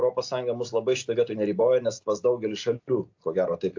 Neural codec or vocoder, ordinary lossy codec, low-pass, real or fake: none; AAC, 48 kbps; 7.2 kHz; real